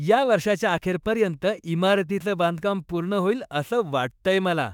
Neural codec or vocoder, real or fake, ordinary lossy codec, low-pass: autoencoder, 48 kHz, 32 numbers a frame, DAC-VAE, trained on Japanese speech; fake; none; 19.8 kHz